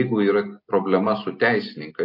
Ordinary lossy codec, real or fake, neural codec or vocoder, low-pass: MP3, 32 kbps; real; none; 5.4 kHz